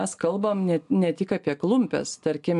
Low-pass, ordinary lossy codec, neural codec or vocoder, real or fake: 10.8 kHz; AAC, 64 kbps; none; real